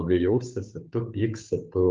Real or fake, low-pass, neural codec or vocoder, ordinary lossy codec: fake; 7.2 kHz; codec, 16 kHz, 2 kbps, FunCodec, trained on Chinese and English, 25 frames a second; Opus, 24 kbps